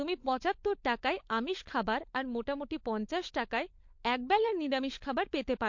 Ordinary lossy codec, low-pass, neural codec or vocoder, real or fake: MP3, 48 kbps; 7.2 kHz; codec, 16 kHz, 16 kbps, FunCodec, trained on LibriTTS, 50 frames a second; fake